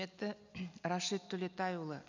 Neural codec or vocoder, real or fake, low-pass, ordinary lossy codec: none; real; 7.2 kHz; none